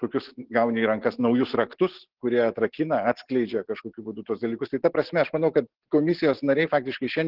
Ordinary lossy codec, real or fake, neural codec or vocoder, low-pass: Opus, 16 kbps; real; none; 5.4 kHz